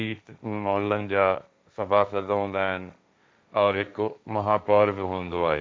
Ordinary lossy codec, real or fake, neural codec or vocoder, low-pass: none; fake; codec, 16 kHz, 1.1 kbps, Voila-Tokenizer; none